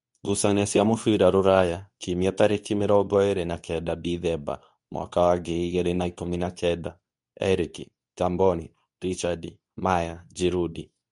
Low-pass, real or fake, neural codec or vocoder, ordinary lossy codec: 10.8 kHz; fake; codec, 24 kHz, 0.9 kbps, WavTokenizer, medium speech release version 2; MP3, 64 kbps